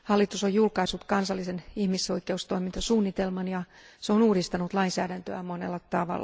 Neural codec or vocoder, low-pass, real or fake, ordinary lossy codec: none; none; real; none